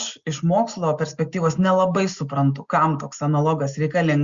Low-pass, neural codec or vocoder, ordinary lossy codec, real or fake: 7.2 kHz; none; Opus, 64 kbps; real